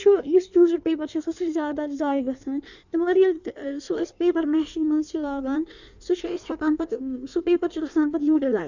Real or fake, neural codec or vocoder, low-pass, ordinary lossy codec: fake; codec, 16 kHz in and 24 kHz out, 1.1 kbps, FireRedTTS-2 codec; 7.2 kHz; none